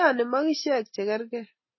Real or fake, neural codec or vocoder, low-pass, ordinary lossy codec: real; none; 7.2 kHz; MP3, 24 kbps